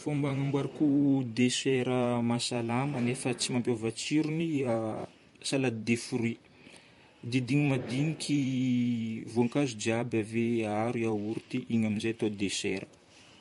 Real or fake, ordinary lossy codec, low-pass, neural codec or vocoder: fake; MP3, 48 kbps; 14.4 kHz; vocoder, 44.1 kHz, 128 mel bands, Pupu-Vocoder